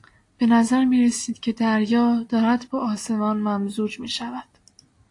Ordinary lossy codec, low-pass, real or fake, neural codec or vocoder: AAC, 48 kbps; 10.8 kHz; real; none